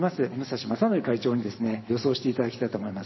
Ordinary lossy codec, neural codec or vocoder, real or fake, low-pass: MP3, 24 kbps; vocoder, 44.1 kHz, 128 mel bands every 512 samples, BigVGAN v2; fake; 7.2 kHz